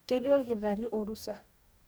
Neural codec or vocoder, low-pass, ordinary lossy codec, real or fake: codec, 44.1 kHz, 2.6 kbps, DAC; none; none; fake